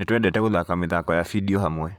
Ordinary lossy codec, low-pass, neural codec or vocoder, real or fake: none; 19.8 kHz; vocoder, 44.1 kHz, 128 mel bands, Pupu-Vocoder; fake